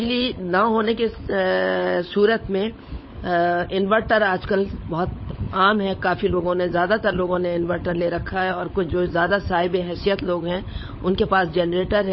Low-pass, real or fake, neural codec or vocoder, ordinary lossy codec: 7.2 kHz; fake; codec, 16 kHz, 16 kbps, FunCodec, trained on LibriTTS, 50 frames a second; MP3, 24 kbps